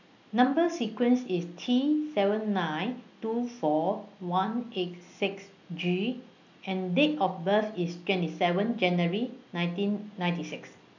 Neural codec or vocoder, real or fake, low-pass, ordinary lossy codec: none; real; 7.2 kHz; none